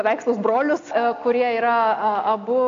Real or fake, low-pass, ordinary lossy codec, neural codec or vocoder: real; 7.2 kHz; AAC, 48 kbps; none